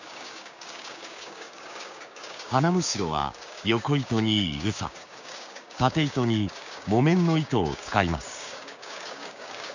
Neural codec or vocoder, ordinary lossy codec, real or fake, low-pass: codec, 16 kHz, 6 kbps, DAC; none; fake; 7.2 kHz